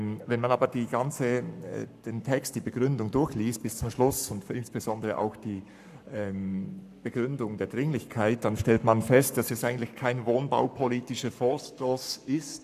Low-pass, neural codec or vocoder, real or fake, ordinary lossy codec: 14.4 kHz; codec, 44.1 kHz, 7.8 kbps, Pupu-Codec; fake; none